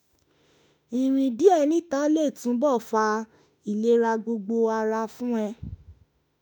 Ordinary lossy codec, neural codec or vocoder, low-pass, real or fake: none; autoencoder, 48 kHz, 32 numbers a frame, DAC-VAE, trained on Japanese speech; 19.8 kHz; fake